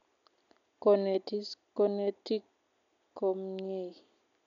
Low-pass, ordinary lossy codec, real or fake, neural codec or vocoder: 7.2 kHz; MP3, 96 kbps; real; none